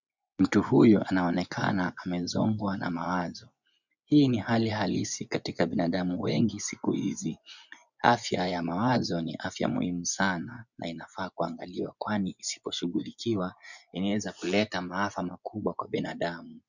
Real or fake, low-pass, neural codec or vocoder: fake; 7.2 kHz; vocoder, 24 kHz, 100 mel bands, Vocos